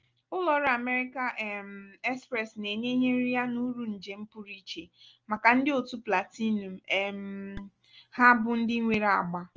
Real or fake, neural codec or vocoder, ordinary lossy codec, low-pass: real; none; Opus, 24 kbps; 7.2 kHz